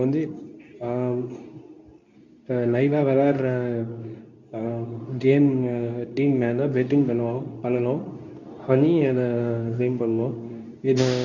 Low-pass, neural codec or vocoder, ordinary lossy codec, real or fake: 7.2 kHz; codec, 24 kHz, 0.9 kbps, WavTokenizer, medium speech release version 2; none; fake